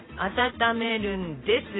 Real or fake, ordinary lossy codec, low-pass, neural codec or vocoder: fake; AAC, 16 kbps; 7.2 kHz; vocoder, 44.1 kHz, 128 mel bands every 512 samples, BigVGAN v2